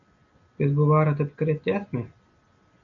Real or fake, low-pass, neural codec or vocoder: real; 7.2 kHz; none